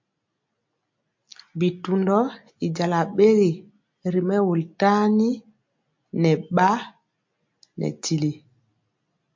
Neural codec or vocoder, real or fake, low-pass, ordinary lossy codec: none; real; 7.2 kHz; MP3, 48 kbps